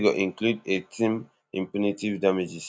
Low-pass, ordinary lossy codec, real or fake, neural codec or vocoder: none; none; real; none